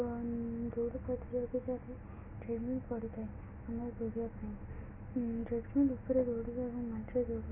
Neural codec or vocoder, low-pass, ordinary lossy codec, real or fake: none; 3.6 kHz; none; real